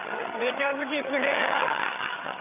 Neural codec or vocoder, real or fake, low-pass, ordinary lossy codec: vocoder, 22.05 kHz, 80 mel bands, HiFi-GAN; fake; 3.6 kHz; none